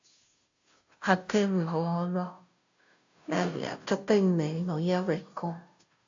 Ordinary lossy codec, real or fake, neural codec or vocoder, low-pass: AAC, 64 kbps; fake; codec, 16 kHz, 0.5 kbps, FunCodec, trained on Chinese and English, 25 frames a second; 7.2 kHz